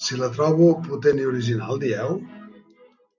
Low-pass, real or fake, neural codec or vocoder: 7.2 kHz; real; none